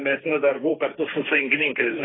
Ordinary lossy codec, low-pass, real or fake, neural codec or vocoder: AAC, 16 kbps; 7.2 kHz; fake; codec, 16 kHz, 1.1 kbps, Voila-Tokenizer